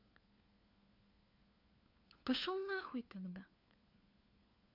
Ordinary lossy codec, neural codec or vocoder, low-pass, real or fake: none; codec, 16 kHz, 4 kbps, FunCodec, trained on LibriTTS, 50 frames a second; 5.4 kHz; fake